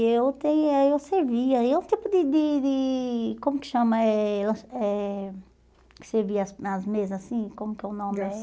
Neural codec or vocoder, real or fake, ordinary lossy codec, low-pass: none; real; none; none